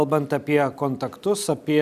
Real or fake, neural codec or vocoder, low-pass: real; none; 14.4 kHz